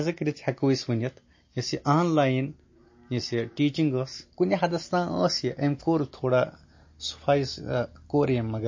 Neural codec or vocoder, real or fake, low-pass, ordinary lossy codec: none; real; 7.2 kHz; MP3, 32 kbps